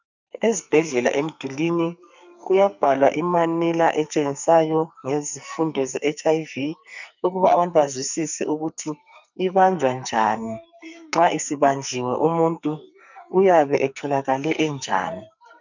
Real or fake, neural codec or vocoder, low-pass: fake; codec, 44.1 kHz, 2.6 kbps, SNAC; 7.2 kHz